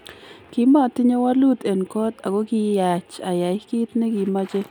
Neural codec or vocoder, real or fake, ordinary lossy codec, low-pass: none; real; none; 19.8 kHz